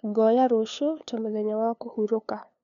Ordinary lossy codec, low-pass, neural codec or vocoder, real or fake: MP3, 96 kbps; 7.2 kHz; codec, 16 kHz, 2 kbps, FunCodec, trained on LibriTTS, 25 frames a second; fake